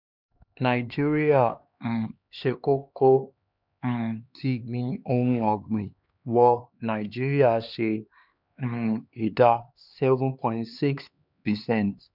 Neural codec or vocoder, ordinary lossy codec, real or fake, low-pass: codec, 16 kHz, 2 kbps, X-Codec, HuBERT features, trained on LibriSpeech; none; fake; 5.4 kHz